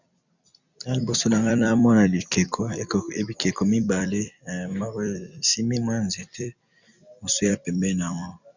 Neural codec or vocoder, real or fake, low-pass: vocoder, 44.1 kHz, 128 mel bands every 256 samples, BigVGAN v2; fake; 7.2 kHz